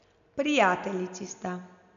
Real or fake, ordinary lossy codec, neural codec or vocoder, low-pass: real; AAC, 96 kbps; none; 7.2 kHz